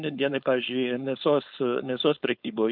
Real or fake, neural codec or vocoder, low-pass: fake; codec, 16 kHz, 4.8 kbps, FACodec; 5.4 kHz